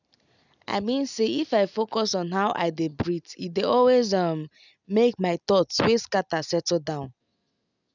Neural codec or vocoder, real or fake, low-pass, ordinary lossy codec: none; real; 7.2 kHz; none